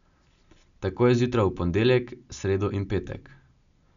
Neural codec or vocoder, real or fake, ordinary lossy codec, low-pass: none; real; none; 7.2 kHz